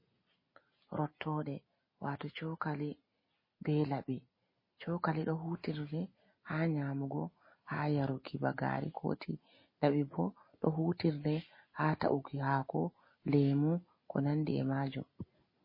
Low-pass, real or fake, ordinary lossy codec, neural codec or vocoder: 5.4 kHz; real; MP3, 24 kbps; none